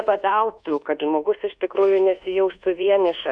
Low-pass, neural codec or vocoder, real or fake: 9.9 kHz; codec, 24 kHz, 1.2 kbps, DualCodec; fake